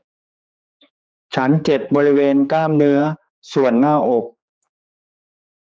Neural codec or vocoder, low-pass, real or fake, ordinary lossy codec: codec, 16 kHz, 4 kbps, X-Codec, HuBERT features, trained on general audio; none; fake; none